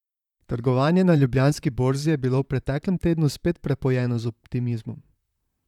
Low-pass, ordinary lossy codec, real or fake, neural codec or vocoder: 19.8 kHz; none; fake; vocoder, 44.1 kHz, 128 mel bands, Pupu-Vocoder